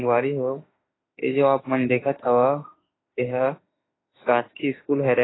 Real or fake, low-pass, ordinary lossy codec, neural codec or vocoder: fake; 7.2 kHz; AAC, 16 kbps; autoencoder, 48 kHz, 32 numbers a frame, DAC-VAE, trained on Japanese speech